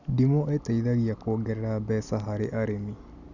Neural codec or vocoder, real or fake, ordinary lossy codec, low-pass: none; real; none; 7.2 kHz